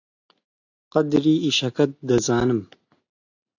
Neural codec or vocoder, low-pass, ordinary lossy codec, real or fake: none; 7.2 kHz; AAC, 48 kbps; real